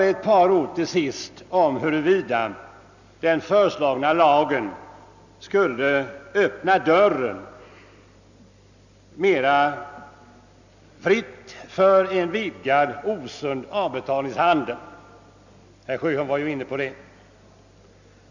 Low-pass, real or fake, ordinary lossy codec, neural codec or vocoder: 7.2 kHz; real; none; none